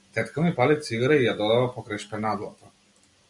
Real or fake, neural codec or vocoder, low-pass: real; none; 10.8 kHz